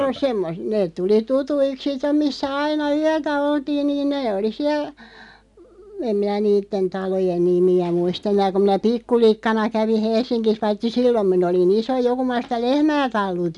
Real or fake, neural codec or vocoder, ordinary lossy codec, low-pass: real; none; none; 10.8 kHz